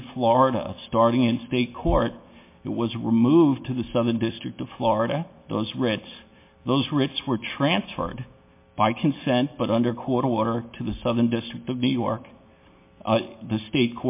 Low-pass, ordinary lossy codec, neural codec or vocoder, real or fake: 3.6 kHz; MP3, 24 kbps; none; real